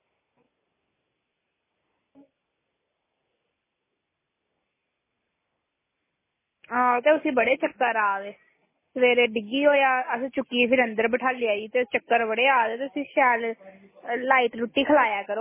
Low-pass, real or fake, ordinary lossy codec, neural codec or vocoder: 3.6 kHz; real; MP3, 16 kbps; none